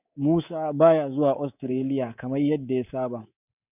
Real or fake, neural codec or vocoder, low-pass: fake; codec, 24 kHz, 3.1 kbps, DualCodec; 3.6 kHz